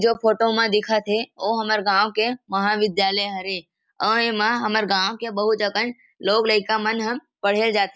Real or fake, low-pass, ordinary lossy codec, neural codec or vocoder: real; none; none; none